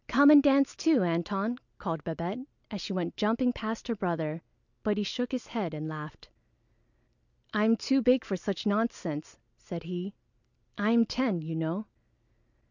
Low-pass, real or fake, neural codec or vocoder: 7.2 kHz; real; none